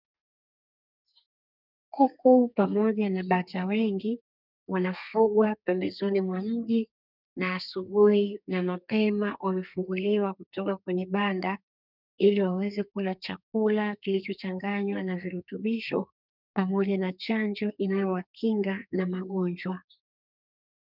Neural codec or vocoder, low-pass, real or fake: codec, 32 kHz, 1.9 kbps, SNAC; 5.4 kHz; fake